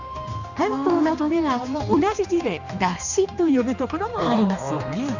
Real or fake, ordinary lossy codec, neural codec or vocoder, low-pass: fake; none; codec, 16 kHz, 2 kbps, X-Codec, HuBERT features, trained on general audio; 7.2 kHz